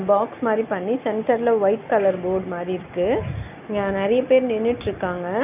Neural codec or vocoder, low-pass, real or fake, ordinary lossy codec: none; 3.6 kHz; real; none